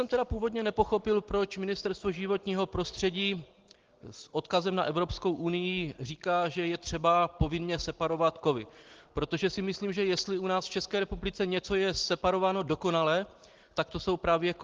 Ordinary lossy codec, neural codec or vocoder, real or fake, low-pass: Opus, 16 kbps; none; real; 7.2 kHz